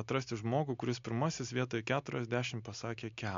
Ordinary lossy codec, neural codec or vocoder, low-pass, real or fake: MP3, 64 kbps; none; 7.2 kHz; real